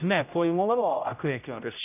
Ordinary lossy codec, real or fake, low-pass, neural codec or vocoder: none; fake; 3.6 kHz; codec, 16 kHz, 0.5 kbps, X-Codec, HuBERT features, trained on general audio